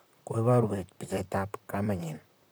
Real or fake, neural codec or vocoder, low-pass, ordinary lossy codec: fake; vocoder, 44.1 kHz, 128 mel bands, Pupu-Vocoder; none; none